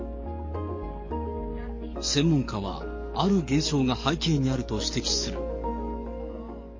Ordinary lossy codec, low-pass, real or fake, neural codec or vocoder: MP3, 32 kbps; 7.2 kHz; fake; codec, 44.1 kHz, 7.8 kbps, DAC